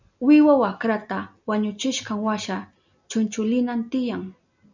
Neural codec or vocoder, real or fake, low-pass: none; real; 7.2 kHz